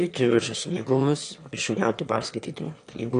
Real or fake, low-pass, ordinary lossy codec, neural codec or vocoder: fake; 9.9 kHz; AAC, 64 kbps; autoencoder, 22.05 kHz, a latent of 192 numbers a frame, VITS, trained on one speaker